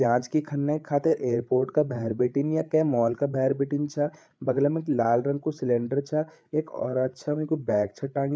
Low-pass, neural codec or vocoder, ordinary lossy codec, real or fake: none; codec, 16 kHz, 8 kbps, FreqCodec, larger model; none; fake